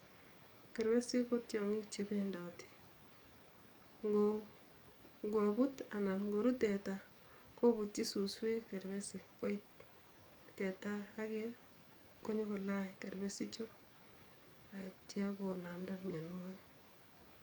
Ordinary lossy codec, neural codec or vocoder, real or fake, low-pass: none; codec, 44.1 kHz, 7.8 kbps, DAC; fake; 19.8 kHz